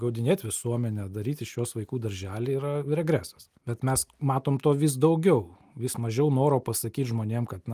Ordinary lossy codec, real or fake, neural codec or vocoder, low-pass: Opus, 32 kbps; real; none; 14.4 kHz